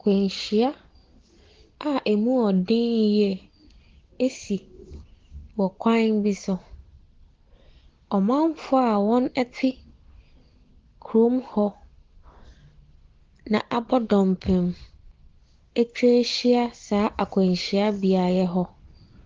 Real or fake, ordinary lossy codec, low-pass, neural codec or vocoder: real; Opus, 16 kbps; 7.2 kHz; none